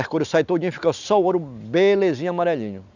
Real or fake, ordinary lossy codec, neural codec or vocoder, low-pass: real; none; none; 7.2 kHz